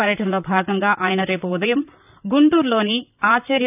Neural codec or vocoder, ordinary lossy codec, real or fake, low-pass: vocoder, 44.1 kHz, 128 mel bands, Pupu-Vocoder; none; fake; 3.6 kHz